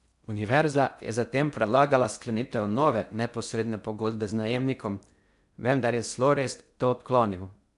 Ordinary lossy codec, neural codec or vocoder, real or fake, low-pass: none; codec, 16 kHz in and 24 kHz out, 0.6 kbps, FocalCodec, streaming, 4096 codes; fake; 10.8 kHz